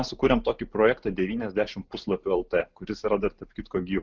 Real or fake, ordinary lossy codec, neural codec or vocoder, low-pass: real; Opus, 32 kbps; none; 7.2 kHz